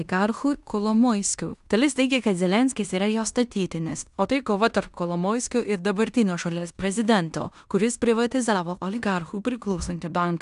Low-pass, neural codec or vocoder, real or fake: 10.8 kHz; codec, 16 kHz in and 24 kHz out, 0.9 kbps, LongCat-Audio-Codec, fine tuned four codebook decoder; fake